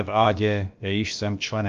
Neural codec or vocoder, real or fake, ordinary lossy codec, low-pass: codec, 16 kHz, about 1 kbps, DyCAST, with the encoder's durations; fake; Opus, 32 kbps; 7.2 kHz